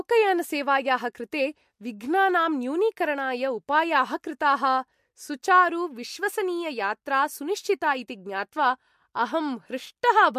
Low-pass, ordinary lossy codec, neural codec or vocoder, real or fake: 14.4 kHz; MP3, 64 kbps; none; real